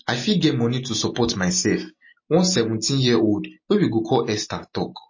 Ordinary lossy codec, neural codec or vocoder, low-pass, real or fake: MP3, 32 kbps; none; 7.2 kHz; real